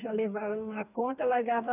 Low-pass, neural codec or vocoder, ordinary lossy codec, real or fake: 3.6 kHz; codec, 44.1 kHz, 2.6 kbps, DAC; none; fake